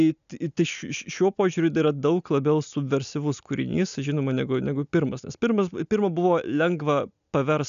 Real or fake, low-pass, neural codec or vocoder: real; 7.2 kHz; none